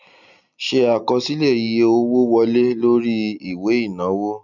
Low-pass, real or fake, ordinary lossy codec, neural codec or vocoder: 7.2 kHz; real; none; none